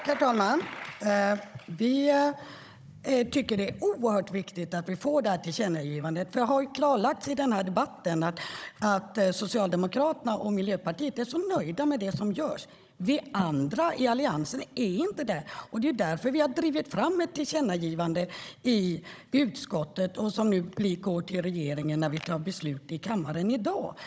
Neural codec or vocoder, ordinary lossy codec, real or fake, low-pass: codec, 16 kHz, 16 kbps, FunCodec, trained on Chinese and English, 50 frames a second; none; fake; none